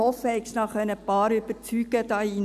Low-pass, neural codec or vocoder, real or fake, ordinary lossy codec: 14.4 kHz; none; real; AAC, 96 kbps